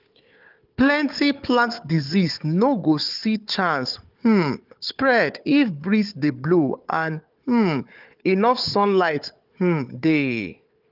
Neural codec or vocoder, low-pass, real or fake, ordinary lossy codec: codec, 16 kHz, 4 kbps, FunCodec, trained on Chinese and English, 50 frames a second; 5.4 kHz; fake; Opus, 24 kbps